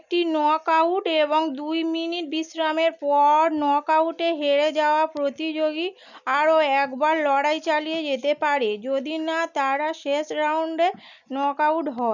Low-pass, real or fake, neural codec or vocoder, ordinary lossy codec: 7.2 kHz; real; none; none